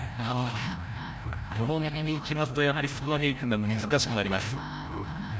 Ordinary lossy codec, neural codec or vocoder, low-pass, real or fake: none; codec, 16 kHz, 0.5 kbps, FreqCodec, larger model; none; fake